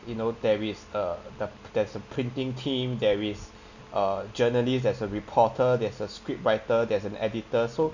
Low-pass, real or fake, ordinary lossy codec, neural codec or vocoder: 7.2 kHz; real; none; none